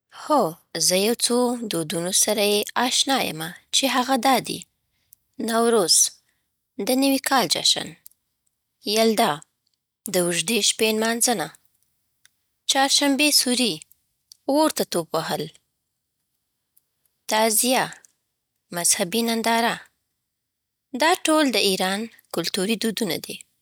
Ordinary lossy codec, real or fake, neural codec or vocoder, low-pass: none; real; none; none